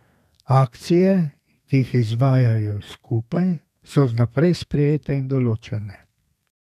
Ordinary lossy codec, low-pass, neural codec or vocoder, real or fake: none; 14.4 kHz; codec, 32 kHz, 1.9 kbps, SNAC; fake